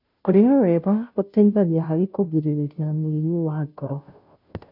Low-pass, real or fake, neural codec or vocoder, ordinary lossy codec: 5.4 kHz; fake; codec, 16 kHz, 0.5 kbps, FunCodec, trained on Chinese and English, 25 frames a second; none